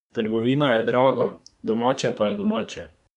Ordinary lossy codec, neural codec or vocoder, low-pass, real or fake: none; codec, 24 kHz, 1 kbps, SNAC; 10.8 kHz; fake